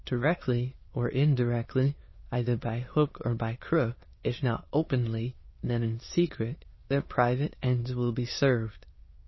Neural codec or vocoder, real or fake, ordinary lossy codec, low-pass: autoencoder, 22.05 kHz, a latent of 192 numbers a frame, VITS, trained on many speakers; fake; MP3, 24 kbps; 7.2 kHz